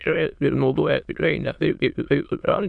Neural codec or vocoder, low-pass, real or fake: autoencoder, 22.05 kHz, a latent of 192 numbers a frame, VITS, trained on many speakers; 9.9 kHz; fake